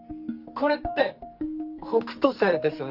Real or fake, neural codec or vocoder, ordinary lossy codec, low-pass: fake; codec, 44.1 kHz, 2.6 kbps, SNAC; Opus, 24 kbps; 5.4 kHz